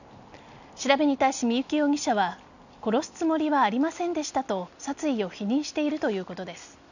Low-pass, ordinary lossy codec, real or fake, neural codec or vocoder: 7.2 kHz; none; real; none